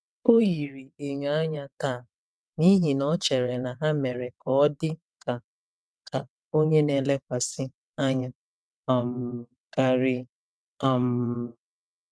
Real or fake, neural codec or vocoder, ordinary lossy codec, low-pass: fake; vocoder, 22.05 kHz, 80 mel bands, WaveNeXt; none; none